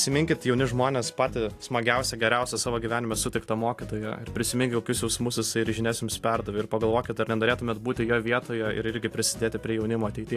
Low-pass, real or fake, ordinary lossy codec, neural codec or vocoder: 14.4 kHz; real; AAC, 64 kbps; none